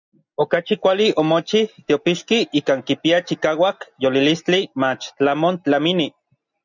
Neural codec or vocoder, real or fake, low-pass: none; real; 7.2 kHz